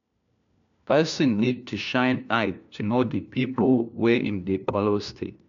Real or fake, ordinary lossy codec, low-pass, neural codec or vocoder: fake; none; 7.2 kHz; codec, 16 kHz, 1 kbps, FunCodec, trained on LibriTTS, 50 frames a second